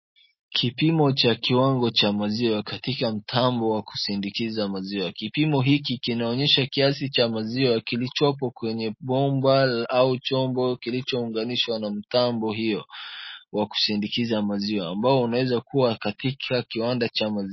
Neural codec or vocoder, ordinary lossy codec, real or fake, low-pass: none; MP3, 24 kbps; real; 7.2 kHz